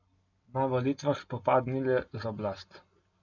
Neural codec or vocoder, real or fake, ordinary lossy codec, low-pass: none; real; none; 7.2 kHz